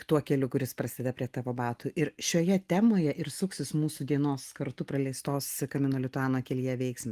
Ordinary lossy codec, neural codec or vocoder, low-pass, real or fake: Opus, 24 kbps; none; 14.4 kHz; real